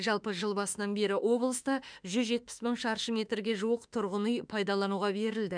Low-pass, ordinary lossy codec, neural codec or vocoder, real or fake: 9.9 kHz; none; autoencoder, 48 kHz, 32 numbers a frame, DAC-VAE, trained on Japanese speech; fake